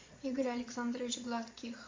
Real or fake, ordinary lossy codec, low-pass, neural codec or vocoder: fake; MP3, 48 kbps; 7.2 kHz; vocoder, 22.05 kHz, 80 mel bands, Vocos